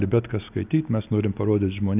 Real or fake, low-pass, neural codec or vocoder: real; 3.6 kHz; none